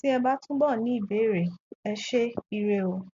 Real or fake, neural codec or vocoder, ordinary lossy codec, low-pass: real; none; MP3, 48 kbps; 7.2 kHz